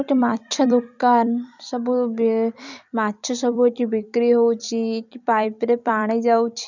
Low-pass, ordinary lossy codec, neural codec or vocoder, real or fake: 7.2 kHz; none; vocoder, 44.1 kHz, 128 mel bands every 256 samples, BigVGAN v2; fake